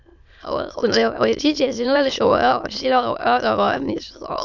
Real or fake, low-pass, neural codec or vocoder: fake; 7.2 kHz; autoencoder, 22.05 kHz, a latent of 192 numbers a frame, VITS, trained on many speakers